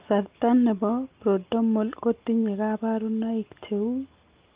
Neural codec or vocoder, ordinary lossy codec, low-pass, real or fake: none; Opus, 32 kbps; 3.6 kHz; real